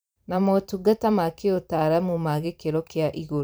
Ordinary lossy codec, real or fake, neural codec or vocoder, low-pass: none; fake; vocoder, 44.1 kHz, 128 mel bands every 512 samples, BigVGAN v2; none